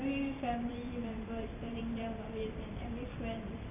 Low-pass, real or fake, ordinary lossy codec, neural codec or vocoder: 3.6 kHz; fake; none; vocoder, 44.1 kHz, 128 mel bands every 512 samples, BigVGAN v2